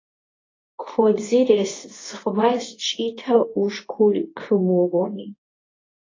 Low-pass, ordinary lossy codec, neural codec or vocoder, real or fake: 7.2 kHz; AAC, 32 kbps; codec, 24 kHz, 0.9 kbps, WavTokenizer, medium speech release version 2; fake